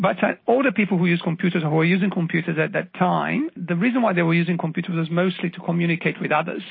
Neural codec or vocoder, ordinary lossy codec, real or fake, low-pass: none; MP3, 24 kbps; real; 5.4 kHz